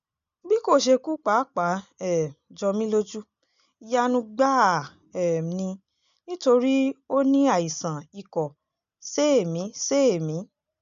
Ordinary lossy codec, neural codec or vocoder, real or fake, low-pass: none; none; real; 7.2 kHz